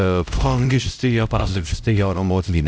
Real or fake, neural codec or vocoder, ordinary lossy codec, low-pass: fake; codec, 16 kHz, 0.5 kbps, X-Codec, HuBERT features, trained on LibriSpeech; none; none